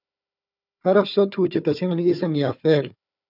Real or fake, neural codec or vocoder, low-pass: fake; codec, 16 kHz, 4 kbps, FunCodec, trained on Chinese and English, 50 frames a second; 5.4 kHz